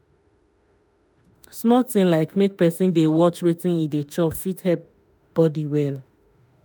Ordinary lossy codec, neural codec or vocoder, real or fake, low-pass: none; autoencoder, 48 kHz, 32 numbers a frame, DAC-VAE, trained on Japanese speech; fake; none